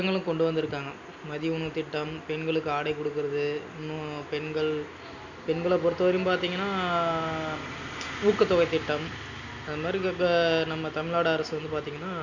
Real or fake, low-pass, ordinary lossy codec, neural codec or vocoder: real; 7.2 kHz; none; none